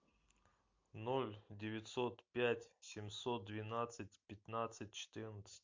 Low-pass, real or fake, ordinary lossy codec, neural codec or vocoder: 7.2 kHz; real; MP3, 48 kbps; none